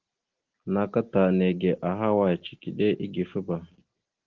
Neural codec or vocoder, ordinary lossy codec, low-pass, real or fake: none; Opus, 16 kbps; 7.2 kHz; real